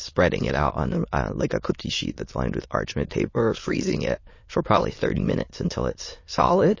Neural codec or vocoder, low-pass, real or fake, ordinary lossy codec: autoencoder, 22.05 kHz, a latent of 192 numbers a frame, VITS, trained on many speakers; 7.2 kHz; fake; MP3, 32 kbps